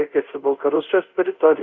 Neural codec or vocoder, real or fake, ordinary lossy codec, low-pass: codec, 24 kHz, 0.5 kbps, DualCodec; fake; Opus, 64 kbps; 7.2 kHz